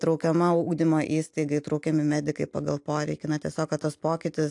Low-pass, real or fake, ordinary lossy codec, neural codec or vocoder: 10.8 kHz; real; AAC, 64 kbps; none